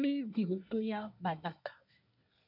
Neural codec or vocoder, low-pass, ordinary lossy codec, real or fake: codec, 24 kHz, 1 kbps, SNAC; 5.4 kHz; none; fake